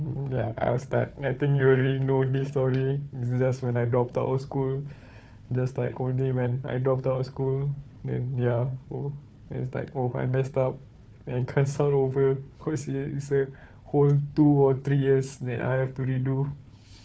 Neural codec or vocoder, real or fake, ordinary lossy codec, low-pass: codec, 16 kHz, 4 kbps, FunCodec, trained on Chinese and English, 50 frames a second; fake; none; none